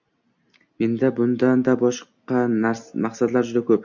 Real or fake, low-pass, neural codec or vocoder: real; 7.2 kHz; none